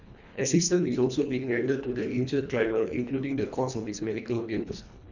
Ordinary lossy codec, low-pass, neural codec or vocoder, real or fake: none; 7.2 kHz; codec, 24 kHz, 1.5 kbps, HILCodec; fake